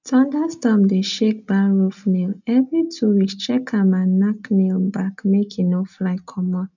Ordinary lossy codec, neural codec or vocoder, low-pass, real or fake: none; none; 7.2 kHz; real